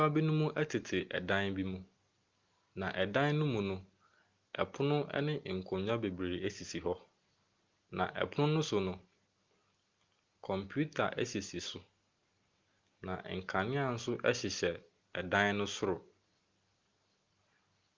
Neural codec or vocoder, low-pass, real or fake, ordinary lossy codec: none; 7.2 kHz; real; Opus, 24 kbps